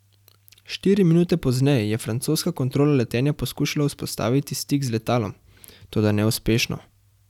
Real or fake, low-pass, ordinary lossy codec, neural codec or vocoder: real; 19.8 kHz; none; none